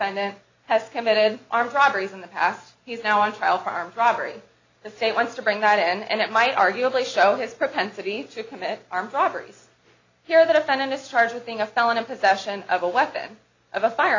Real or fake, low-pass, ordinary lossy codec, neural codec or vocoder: real; 7.2 kHz; AAC, 48 kbps; none